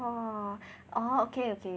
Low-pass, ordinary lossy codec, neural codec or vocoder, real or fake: none; none; none; real